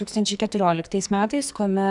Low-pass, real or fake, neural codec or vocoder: 10.8 kHz; fake; codec, 44.1 kHz, 2.6 kbps, SNAC